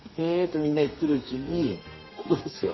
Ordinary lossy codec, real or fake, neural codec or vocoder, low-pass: MP3, 24 kbps; fake; codec, 32 kHz, 1.9 kbps, SNAC; 7.2 kHz